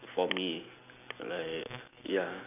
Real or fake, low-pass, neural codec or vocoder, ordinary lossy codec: real; 3.6 kHz; none; none